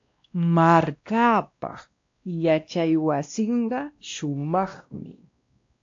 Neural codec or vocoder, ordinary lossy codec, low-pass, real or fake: codec, 16 kHz, 1 kbps, X-Codec, WavLM features, trained on Multilingual LibriSpeech; AAC, 48 kbps; 7.2 kHz; fake